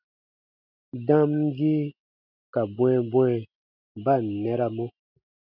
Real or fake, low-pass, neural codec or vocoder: real; 5.4 kHz; none